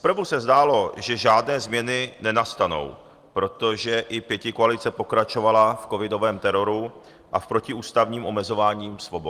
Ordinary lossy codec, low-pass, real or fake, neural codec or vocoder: Opus, 24 kbps; 14.4 kHz; real; none